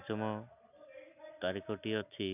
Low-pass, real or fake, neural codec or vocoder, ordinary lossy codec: 3.6 kHz; real; none; none